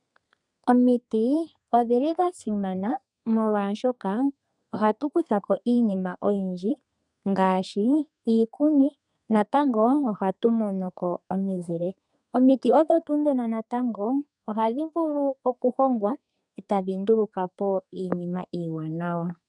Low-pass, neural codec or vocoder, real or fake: 10.8 kHz; codec, 32 kHz, 1.9 kbps, SNAC; fake